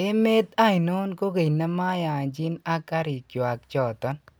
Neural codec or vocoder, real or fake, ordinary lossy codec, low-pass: none; real; none; none